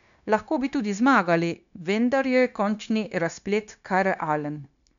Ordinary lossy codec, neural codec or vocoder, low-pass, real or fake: none; codec, 16 kHz, 0.9 kbps, LongCat-Audio-Codec; 7.2 kHz; fake